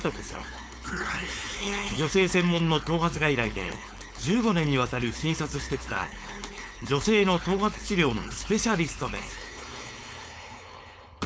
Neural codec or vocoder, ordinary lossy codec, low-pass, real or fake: codec, 16 kHz, 4.8 kbps, FACodec; none; none; fake